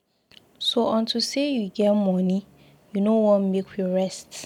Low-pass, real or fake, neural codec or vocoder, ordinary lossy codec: 19.8 kHz; real; none; none